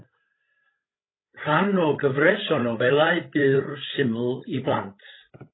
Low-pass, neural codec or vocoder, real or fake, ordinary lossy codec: 7.2 kHz; codec, 16 kHz, 16 kbps, FreqCodec, larger model; fake; AAC, 16 kbps